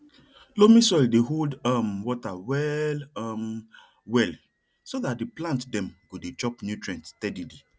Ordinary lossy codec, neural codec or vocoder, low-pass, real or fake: none; none; none; real